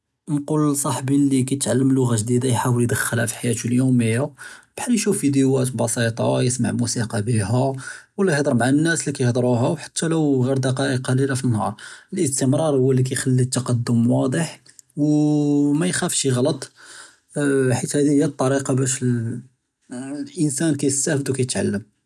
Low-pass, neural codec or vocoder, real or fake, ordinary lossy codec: none; none; real; none